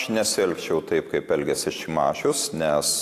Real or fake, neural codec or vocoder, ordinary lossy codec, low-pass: real; none; AAC, 64 kbps; 14.4 kHz